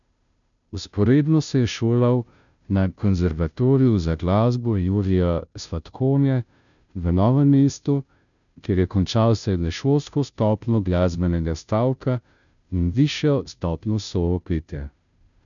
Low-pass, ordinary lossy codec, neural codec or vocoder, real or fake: 7.2 kHz; none; codec, 16 kHz, 0.5 kbps, FunCodec, trained on Chinese and English, 25 frames a second; fake